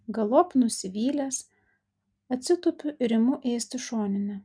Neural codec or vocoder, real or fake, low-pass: none; real; 9.9 kHz